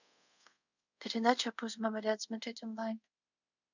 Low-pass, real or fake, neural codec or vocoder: 7.2 kHz; fake; codec, 24 kHz, 0.5 kbps, DualCodec